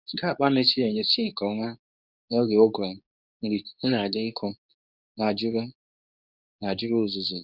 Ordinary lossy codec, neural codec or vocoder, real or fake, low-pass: none; codec, 24 kHz, 0.9 kbps, WavTokenizer, medium speech release version 2; fake; 5.4 kHz